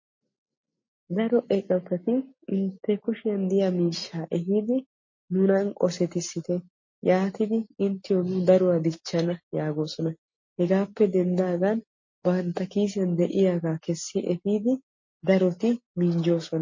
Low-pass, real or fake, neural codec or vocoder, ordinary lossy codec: 7.2 kHz; real; none; MP3, 32 kbps